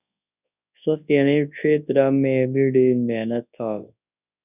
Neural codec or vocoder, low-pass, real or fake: codec, 24 kHz, 0.9 kbps, WavTokenizer, large speech release; 3.6 kHz; fake